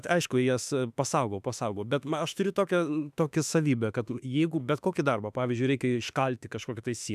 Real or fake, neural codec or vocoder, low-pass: fake; autoencoder, 48 kHz, 32 numbers a frame, DAC-VAE, trained on Japanese speech; 14.4 kHz